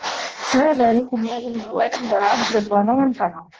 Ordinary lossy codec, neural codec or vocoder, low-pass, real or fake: Opus, 16 kbps; codec, 16 kHz in and 24 kHz out, 0.6 kbps, FireRedTTS-2 codec; 7.2 kHz; fake